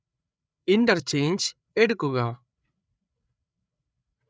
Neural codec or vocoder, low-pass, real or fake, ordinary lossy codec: codec, 16 kHz, 8 kbps, FreqCodec, larger model; none; fake; none